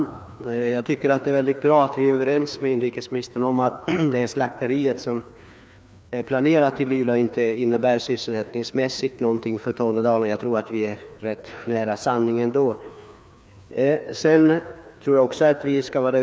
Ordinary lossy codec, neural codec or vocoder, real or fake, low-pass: none; codec, 16 kHz, 2 kbps, FreqCodec, larger model; fake; none